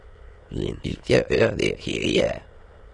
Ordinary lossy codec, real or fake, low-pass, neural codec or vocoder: AAC, 32 kbps; fake; 9.9 kHz; autoencoder, 22.05 kHz, a latent of 192 numbers a frame, VITS, trained on many speakers